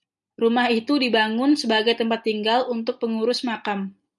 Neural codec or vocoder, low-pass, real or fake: none; 10.8 kHz; real